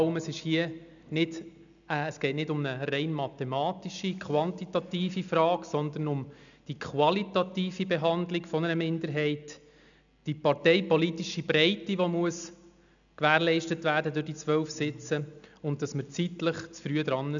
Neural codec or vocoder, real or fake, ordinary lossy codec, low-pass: none; real; MP3, 96 kbps; 7.2 kHz